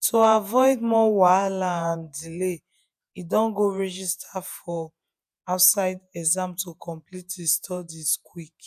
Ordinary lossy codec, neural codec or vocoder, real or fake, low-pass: none; vocoder, 48 kHz, 128 mel bands, Vocos; fake; 14.4 kHz